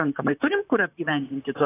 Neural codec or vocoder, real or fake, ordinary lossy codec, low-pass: vocoder, 44.1 kHz, 128 mel bands, Pupu-Vocoder; fake; AAC, 16 kbps; 3.6 kHz